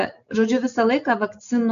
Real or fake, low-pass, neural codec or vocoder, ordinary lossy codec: real; 7.2 kHz; none; MP3, 96 kbps